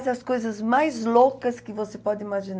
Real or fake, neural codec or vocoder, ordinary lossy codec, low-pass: real; none; none; none